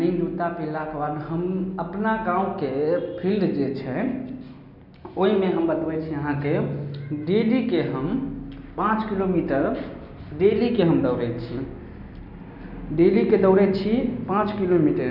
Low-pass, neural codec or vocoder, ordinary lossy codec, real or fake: 5.4 kHz; none; none; real